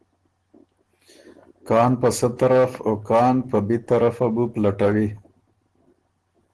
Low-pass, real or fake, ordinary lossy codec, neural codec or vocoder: 10.8 kHz; real; Opus, 16 kbps; none